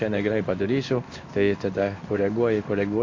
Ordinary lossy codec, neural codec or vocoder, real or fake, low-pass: MP3, 48 kbps; codec, 16 kHz in and 24 kHz out, 1 kbps, XY-Tokenizer; fake; 7.2 kHz